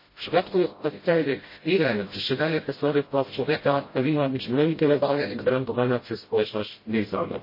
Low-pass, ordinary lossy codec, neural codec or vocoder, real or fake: 5.4 kHz; MP3, 24 kbps; codec, 16 kHz, 0.5 kbps, FreqCodec, smaller model; fake